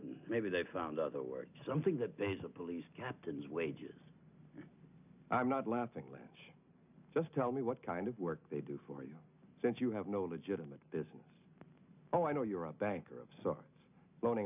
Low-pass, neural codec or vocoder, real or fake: 3.6 kHz; none; real